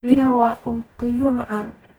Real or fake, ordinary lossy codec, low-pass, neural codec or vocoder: fake; none; none; codec, 44.1 kHz, 0.9 kbps, DAC